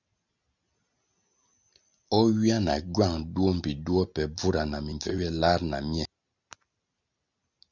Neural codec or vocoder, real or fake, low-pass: none; real; 7.2 kHz